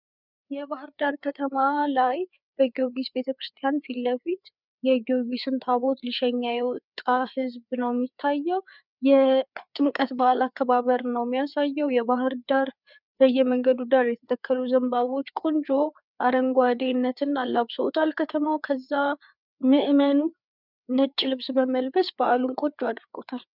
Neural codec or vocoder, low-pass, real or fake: codec, 16 kHz, 4 kbps, FreqCodec, larger model; 5.4 kHz; fake